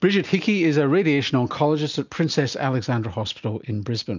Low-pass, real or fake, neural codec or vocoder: 7.2 kHz; real; none